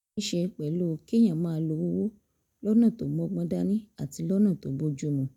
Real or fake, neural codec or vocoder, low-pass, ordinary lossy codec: real; none; 19.8 kHz; none